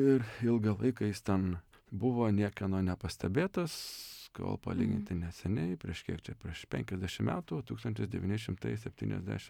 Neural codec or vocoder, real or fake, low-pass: none; real; 19.8 kHz